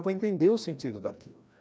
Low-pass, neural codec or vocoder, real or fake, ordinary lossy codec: none; codec, 16 kHz, 1 kbps, FreqCodec, larger model; fake; none